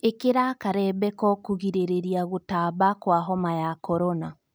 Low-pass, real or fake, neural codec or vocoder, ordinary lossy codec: none; real; none; none